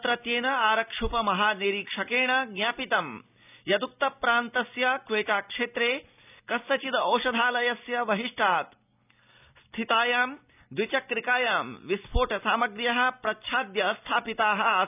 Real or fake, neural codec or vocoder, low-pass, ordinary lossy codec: real; none; 3.6 kHz; none